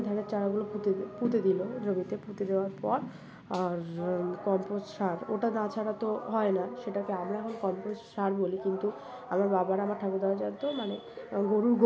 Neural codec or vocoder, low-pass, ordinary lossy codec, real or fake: none; none; none; real